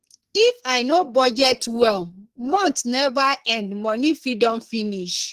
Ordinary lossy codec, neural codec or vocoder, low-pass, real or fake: Opus, 16 kbps; codec, 44.1 kHz, 2.6 kbps, SNAC; 14.4 kHz; fake